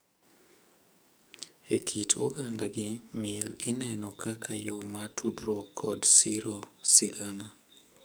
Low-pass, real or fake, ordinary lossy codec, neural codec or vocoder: none; fake; none; codec, 44.1 kHz, 2.6 kbps, SNAC